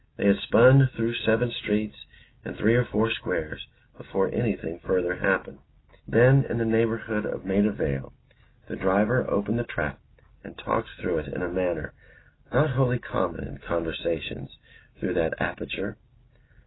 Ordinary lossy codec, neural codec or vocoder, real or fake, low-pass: AAC, 16 kbps; none; real; 7.2 kHz